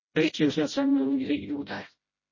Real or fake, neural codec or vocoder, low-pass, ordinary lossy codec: fake; codec, 16 kHz, 0.5 kbps, FreqCodec, smaller model; 7.2 kHz; MP3, 32 kbps